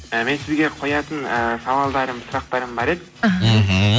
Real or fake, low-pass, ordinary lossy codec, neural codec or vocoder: real; none; none; none